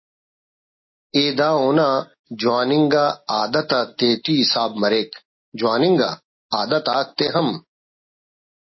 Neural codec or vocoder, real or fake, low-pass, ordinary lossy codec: none; real; 7.2 kHz; MP3, 24 kbps